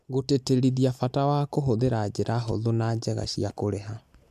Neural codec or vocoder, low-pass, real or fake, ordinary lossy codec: none; 14.4 kHz; real; MP3, 96 kbps